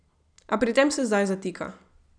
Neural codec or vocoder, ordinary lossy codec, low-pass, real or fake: none; none; 9.9 kHz; real